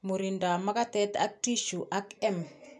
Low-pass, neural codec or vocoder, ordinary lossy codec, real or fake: none; none; none; real